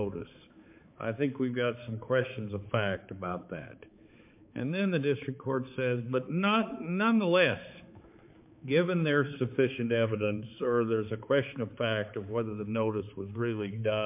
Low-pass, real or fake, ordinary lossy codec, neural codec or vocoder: 3.6 kHz; fake; MP3, 32 kbps; codec, 16 kHz, 4 kbps, X-Codec, HuBERT features, trained on balanced general audio